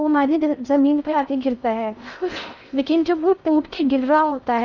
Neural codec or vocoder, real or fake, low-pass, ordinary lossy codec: codec, 16 kHz in and 24 kHz out, 0.6 kbps, FocalCodec, streaming, 4096 codes; fake; 7.2 kHz; none